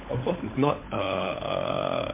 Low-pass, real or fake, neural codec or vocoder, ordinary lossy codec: 3.6 kHz; fake; codec, 16 kHz, 16 kbps, FunCodec, trained on LibriTTS, 50 frames a second; MP3, 24 kbps